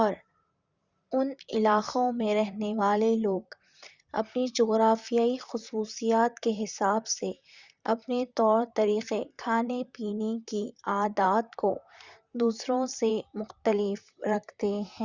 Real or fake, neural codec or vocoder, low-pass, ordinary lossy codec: fake; vocoder, 44.1 kHz, 128 mel bands, Pupu-Vocoder; 7.2 kHz; Opus, 64 kbps